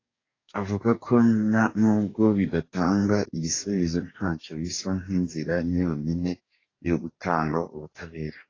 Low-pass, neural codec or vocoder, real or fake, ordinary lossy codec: 7.2 kHz; codec, 44.1 kHz, 2.6 kbps, DAC; fake; AAC, 32 kbps